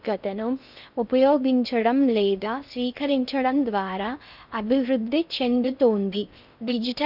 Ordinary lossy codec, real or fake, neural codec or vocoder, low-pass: none; fake; codec, 16 kHz in and 24 kHz out, 0.6 kbps, FocalCodec, streaming, 2048 codes; 5.4 kHz